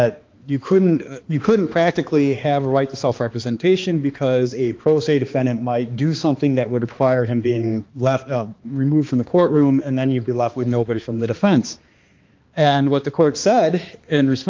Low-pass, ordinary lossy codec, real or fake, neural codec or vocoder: 7.2 kHz; Opus, 24 kbps; fake; codec, 16 kHz, 2 kbps, X-Codec, HuBERT features, trained on balanced general audio